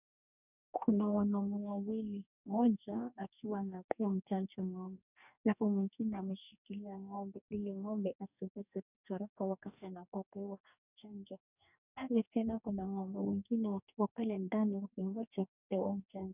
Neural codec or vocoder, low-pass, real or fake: codec, 44.1 kHz, 2.6 kbps, DAC; 3.6 kHz; fake